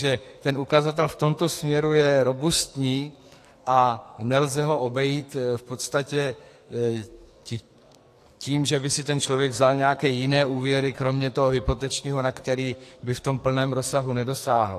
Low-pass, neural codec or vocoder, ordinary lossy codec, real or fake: 14.4 kHz; codec, 44.1 kHz, 2.6 kbps, SNAC; AAC, 64 kbps; fake